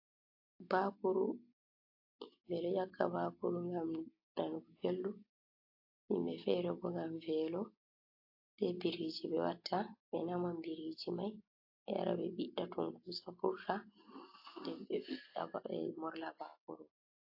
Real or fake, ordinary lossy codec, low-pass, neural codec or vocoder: real; AAC, 32 kbps; 5.4 kHz; none